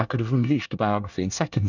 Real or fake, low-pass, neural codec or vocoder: fake; 7.2 kHz; codec, 24 kHz, 1 kbps, SNAC